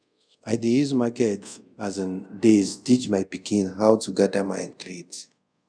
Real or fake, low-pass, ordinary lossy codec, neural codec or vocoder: fake; 9.9 kHz; none; codec, 24 kHz, 0.5 kbps, DualCodec